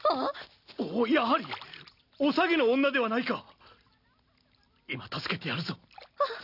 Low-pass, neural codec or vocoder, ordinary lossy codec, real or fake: 5.4 kHz; none; none; real